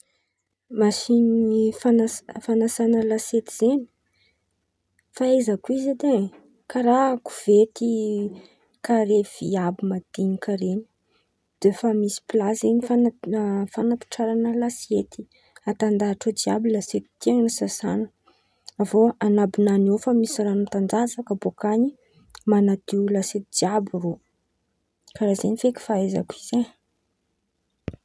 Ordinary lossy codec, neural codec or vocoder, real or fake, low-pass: none; none; real; none